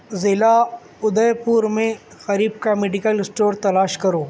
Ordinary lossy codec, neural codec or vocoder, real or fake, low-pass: none; none; real; none